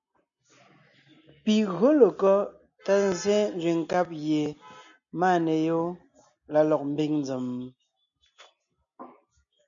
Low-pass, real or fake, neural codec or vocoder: 7.2 kHz; real; none